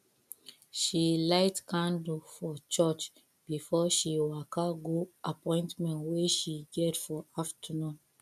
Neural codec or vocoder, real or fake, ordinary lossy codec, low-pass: none; real; none; 14.4 kHz